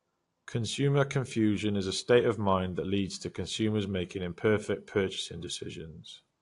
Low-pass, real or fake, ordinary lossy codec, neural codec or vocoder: 10.8 kHz; real; AAC, 48 kbps; none